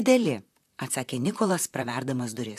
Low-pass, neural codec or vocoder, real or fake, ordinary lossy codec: 14.4 kHz; vocoder, 44.1 kHz, 128 mel bands, Pupu-Vocoder; fake; AAC, 96 kbps